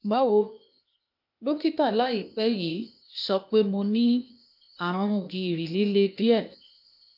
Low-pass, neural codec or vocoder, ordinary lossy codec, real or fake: 5.4 kHz; codec, 16 kHz, 0.8 kbps, ZipCodec; none; fake